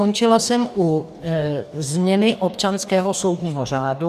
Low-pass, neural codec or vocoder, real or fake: 14.4 kHz; codec, 44.1 kHz, 2.6 kbps, DAC; fake